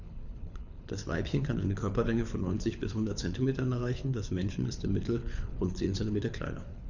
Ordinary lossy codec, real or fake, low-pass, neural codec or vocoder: none; fake; 7.2 kHz; codec, 24 kHz, 6 kbps, HILCodec